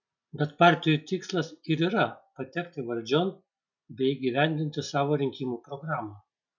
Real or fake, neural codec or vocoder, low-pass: real; none; 7.2 kHz